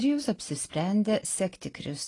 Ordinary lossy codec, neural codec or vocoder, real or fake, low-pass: AAC, 32 kbps; none; real; 10.8 kHz